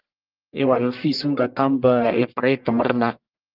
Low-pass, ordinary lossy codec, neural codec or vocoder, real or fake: 5.4 kHz; Opus, 24 kbps; codec, 44.1 kHz, 1.7 kbps, Pupu-Codec; fake